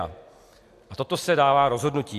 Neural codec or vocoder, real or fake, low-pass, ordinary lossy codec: none; real; 14.4 kHz; AAC, 64 kbps